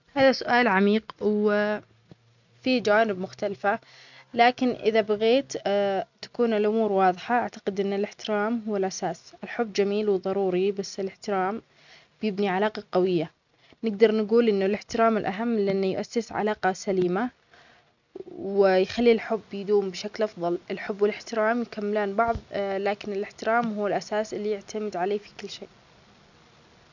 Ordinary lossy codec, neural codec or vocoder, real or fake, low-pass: none; none; real; 7.2 kHz